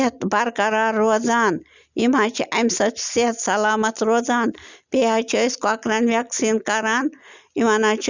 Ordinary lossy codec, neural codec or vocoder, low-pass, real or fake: Opus, 64 kbps; none; 7.2 kHz; real